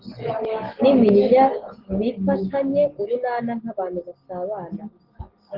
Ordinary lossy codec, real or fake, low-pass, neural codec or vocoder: Opus, 16 kbps; real; 5.4 kHz; none